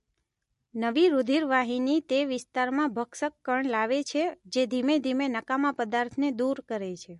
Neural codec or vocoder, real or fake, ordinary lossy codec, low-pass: none; real; MP3, 48 kbps; 14.4 kHz